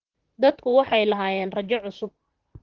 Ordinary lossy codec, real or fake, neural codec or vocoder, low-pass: Opus, 16 kbps; fake; vocoder, 44.1 kHz, 128 mel bands, Pupu-Vocoder; 7.2 kHz